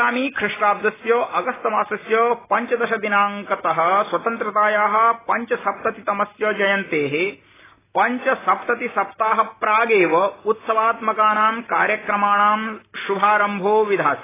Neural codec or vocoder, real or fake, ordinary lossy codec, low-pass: none; real; AAC, 16 kbps; 3.6 kHz